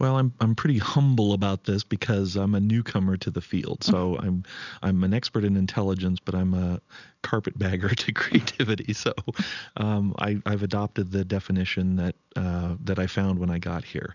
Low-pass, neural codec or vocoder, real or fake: 7.2 kHz; none; real